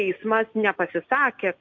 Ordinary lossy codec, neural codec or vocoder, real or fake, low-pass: MP3, 48 kbps; none; real; 7.2 kHz